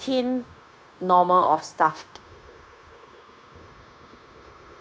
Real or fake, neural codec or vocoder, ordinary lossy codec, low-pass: fake; codec, 16 kHz, 0.9 kbps, LongCat-Audio-Codec; none; none